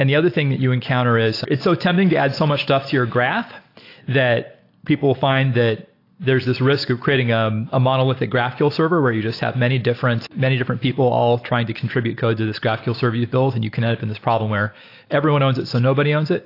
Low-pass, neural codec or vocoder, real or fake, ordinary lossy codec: 5.4 kHz; none; real; AAC, 32 kbps